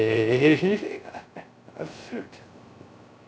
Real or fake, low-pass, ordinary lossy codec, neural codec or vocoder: fake; none; none; codec, 16 kHz, 0.3 kbps, FocalCodec